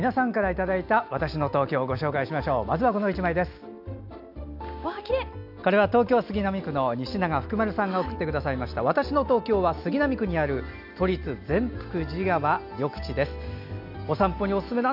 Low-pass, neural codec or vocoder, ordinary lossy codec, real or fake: 5.4 kHz; none; AAC, 48 kbps; real